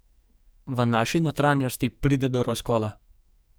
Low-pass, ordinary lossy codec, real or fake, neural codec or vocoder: none; none; fake; codec, 44.1 kHz, 2.6 kbps, SNAC